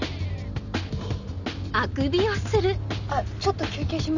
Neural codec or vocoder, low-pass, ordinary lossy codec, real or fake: none; 7.2 kHz; none; real